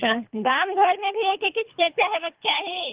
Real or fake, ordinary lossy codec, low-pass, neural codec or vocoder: fake; Opus, 32 kbps; 3.6 kHz; codec, 24 kHz, 3 kbps, HILCodec